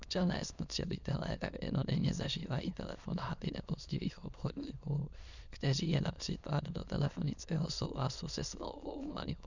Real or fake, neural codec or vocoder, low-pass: fake; autoencoder, 22.05 kHz, a latent of 192 numbers a frame, VITS, trained on many speakers; 7.2 kHz